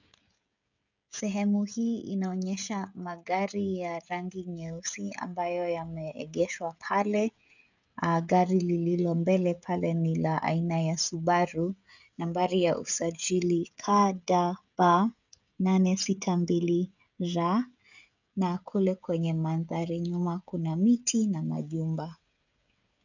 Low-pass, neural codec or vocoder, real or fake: 7.2 kHz; codec, 16 kHz, 16 kbps, FreqCodec, smaller model; fake